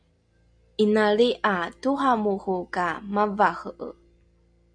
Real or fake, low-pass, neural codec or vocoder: real; 9.9 kHz; none